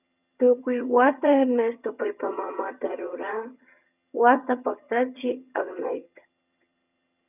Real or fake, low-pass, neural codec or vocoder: fake; 3.6 kHz; vocoder, 22.05 kHz, 80 mel bands, HiFi-GAN